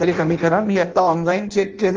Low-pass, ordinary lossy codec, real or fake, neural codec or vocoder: 7.2 kHz; Opus, 24 kbps; fake; codec, 16 kHz in and 24 kHz out, 0.6 kbps, FireRedTTS-2 codec